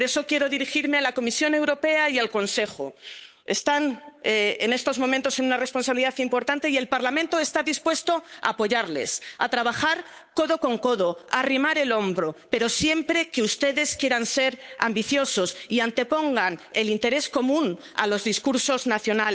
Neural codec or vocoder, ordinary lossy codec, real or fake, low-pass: codec, 16 kHz, 8 kbps, FunCodec, trained on Chinese and English, 25 frames a second; none; fake; none